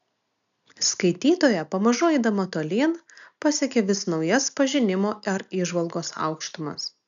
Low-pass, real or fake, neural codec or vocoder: 7.2 kHz; real; none